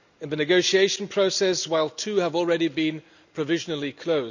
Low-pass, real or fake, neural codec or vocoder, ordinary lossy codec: 7.2 kHz; real; none; none